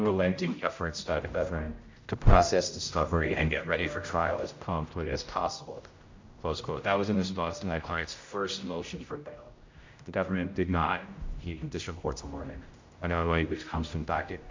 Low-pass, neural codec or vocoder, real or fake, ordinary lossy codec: 7.2 kHz; codec, 16 kHz, 0.5 kbps, X-Codec, HuBERT features, trained on general audio; fake; MP3, 64 kbps